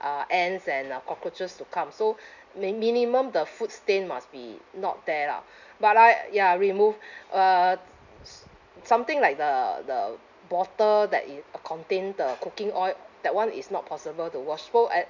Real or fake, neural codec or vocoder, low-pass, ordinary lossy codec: real; none; 7.2 kHz; none